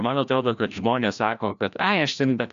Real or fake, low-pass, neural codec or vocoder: fake; 7.2 kHz; codec, 16 kHz, 1 kbps, FreqCodec, larger model